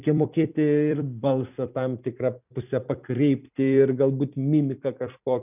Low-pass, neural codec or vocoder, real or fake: 3.6 kHz; vocoder, 44.1 kHz, 128 mel bands every 256 samples, BigVGAN v2; fake